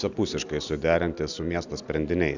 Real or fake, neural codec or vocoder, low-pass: real; none; 7.2 kHz